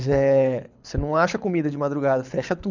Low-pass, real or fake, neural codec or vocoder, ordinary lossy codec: 7.2 kHz; fake; codec, 24 kHz, 6 kbps, HILCodec; none